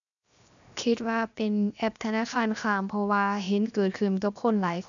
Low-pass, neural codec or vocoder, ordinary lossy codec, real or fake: 7.2 kHz; codec, 16 kHz, 0.7 kbps, FocalCodec; none; fake